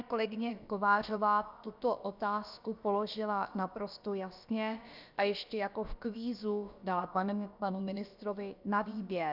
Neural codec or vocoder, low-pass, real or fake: codec, 16 kHz, about 1 kbps, DyCAST, with the encoder's durations; 5.4 kHz; fake